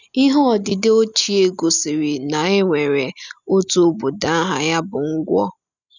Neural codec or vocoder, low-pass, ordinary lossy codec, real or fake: none; 7.2 kHz; none; real